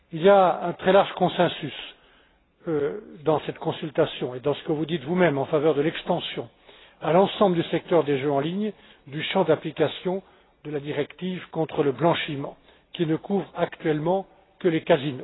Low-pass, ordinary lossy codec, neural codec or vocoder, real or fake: 7.2 kHz; AAC, 16 kbps; none; real